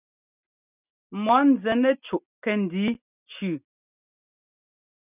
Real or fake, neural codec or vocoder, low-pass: real; none; 3.6 kHz